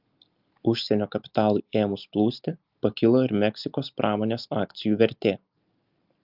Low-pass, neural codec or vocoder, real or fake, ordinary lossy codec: 5.4 kHz; none; real; Opus, 24 kbps